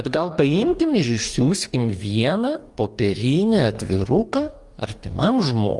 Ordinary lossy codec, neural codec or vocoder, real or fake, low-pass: Opus, 32 kbps; codec, 44.1 kHz, 2.6 kbps, DAC; fake; 10.8 kHz